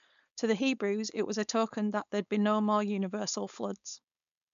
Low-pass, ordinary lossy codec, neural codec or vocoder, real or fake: 7.2 kHz; none; codec, 16 kHz, 4.8 kbps, FACodec; fake